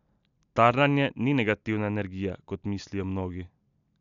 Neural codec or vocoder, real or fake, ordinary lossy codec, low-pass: none; real; none; 7.2 kHz